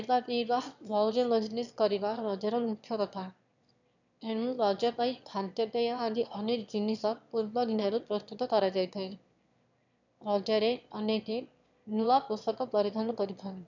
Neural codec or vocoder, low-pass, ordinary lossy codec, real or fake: autoencoder, 22.05 kHz, a latent of 192 numbers a frame, VITS, trained on one speaker; 7.2 kHz; none; fake